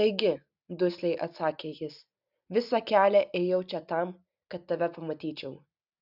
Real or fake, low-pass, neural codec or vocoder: real; 5.4 kHz; none